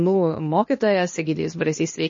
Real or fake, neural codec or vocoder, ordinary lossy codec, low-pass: fake; codec, 16 kHz, 0.8 kbps, ZipCodec; MP3, 32 kbps; 7.2 kHz